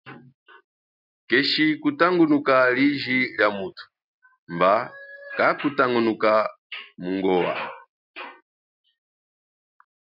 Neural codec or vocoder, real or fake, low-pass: none; real; 5.4 kHz